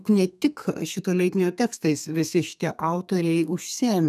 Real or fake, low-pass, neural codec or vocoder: fake; 14.4 kHz; codec, 32 kHz, 1.9 kbps, SNAC